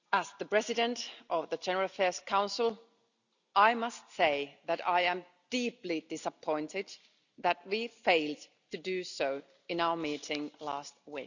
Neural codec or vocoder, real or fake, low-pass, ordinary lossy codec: none; real; 7.2 kHz; none